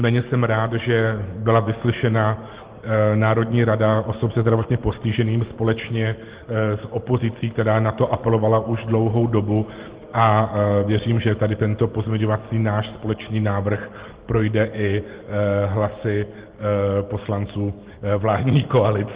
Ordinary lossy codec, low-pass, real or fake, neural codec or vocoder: Opus, 16 kbps; 3.6 kHz; real; none